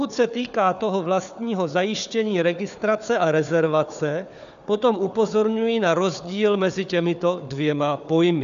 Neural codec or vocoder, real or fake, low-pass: codec, 16 kHz, 4 kbps, FunCodec, trained on Chinese and English, 50 frames a second; fake; 7.2 kHz